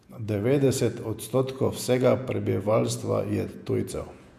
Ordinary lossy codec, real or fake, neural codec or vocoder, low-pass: MP3, 96 kbps; fake; vocoder, 48 kHz, 128 mel bands, Vocos; 14.4 kHz